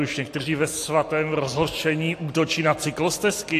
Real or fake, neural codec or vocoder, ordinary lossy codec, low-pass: real; none; AAC, 64 kbps; 14.4 kHz